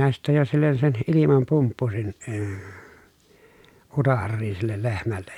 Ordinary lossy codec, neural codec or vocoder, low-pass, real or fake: none; none; 19.8 kHz; real